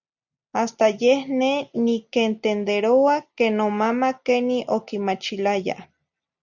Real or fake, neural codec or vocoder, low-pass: real; none; 7.2 kHz